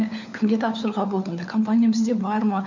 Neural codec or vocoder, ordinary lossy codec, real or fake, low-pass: codec, 16 kHz, 4 kbps, FunCodec, trained on LibriTTS, 50 frames a second; none; fake; 7.2 kHz